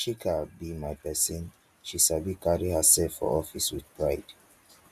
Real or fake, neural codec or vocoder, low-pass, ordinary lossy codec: real; none; 14.4 kHz; none